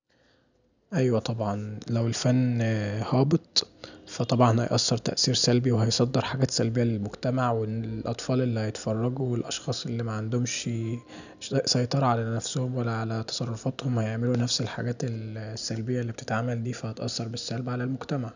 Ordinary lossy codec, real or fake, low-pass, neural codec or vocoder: none; real; 7.2 kHz; none